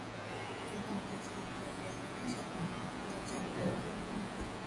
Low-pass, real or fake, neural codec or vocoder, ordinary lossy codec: 10.8 kHz; fake; vocoder, 48 kHz, 128 mel bands, Vocos; AAC, 32 kbps